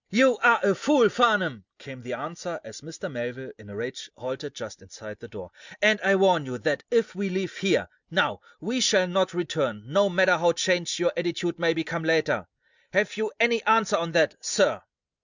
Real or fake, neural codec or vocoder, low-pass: real; none; 7.2 kHz